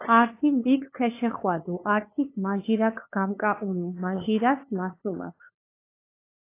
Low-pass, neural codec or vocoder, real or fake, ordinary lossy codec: 3.6 kHz; codec, 16 kHz, 2 kbps, FunCodec, trained on LibriTTS, 25 frames a second; fake; AAC, 24 kbps